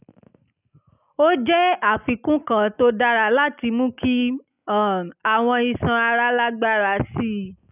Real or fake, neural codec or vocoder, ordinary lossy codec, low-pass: real; none; none; 3.6 kHz